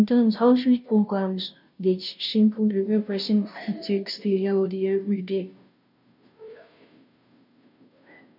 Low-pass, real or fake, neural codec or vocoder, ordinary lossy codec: 5.4 kHz; fake; codec, 16 kHz, 0.5 kbps, FunCodec, trained on Chinese and English, 25 frames a second; none